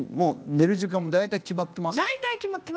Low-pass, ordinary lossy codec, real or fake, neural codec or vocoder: none; none; fake; codec, 16 kHz, 0.8 kbps, ZipCodec